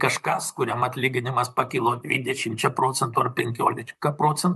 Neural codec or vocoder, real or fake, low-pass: vocoder, 44.1 kHz, 128 mel bands, Pupu-Vocoder; fake; 14.4 kHz